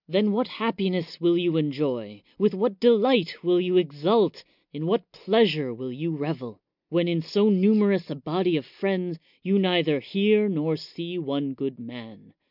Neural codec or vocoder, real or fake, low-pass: none; real; 5.4 kHz